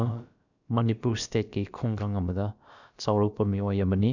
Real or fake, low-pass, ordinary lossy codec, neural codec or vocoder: fake; 7.2 kHz; none; codec, 16 kHz, about 1 kbps, DyCAST, with the encoder's durations